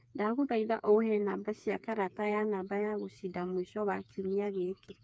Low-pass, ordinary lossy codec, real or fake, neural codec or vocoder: none; none; fake; codec, 16 kHz, 4 kbps, FreqCodec, smaller model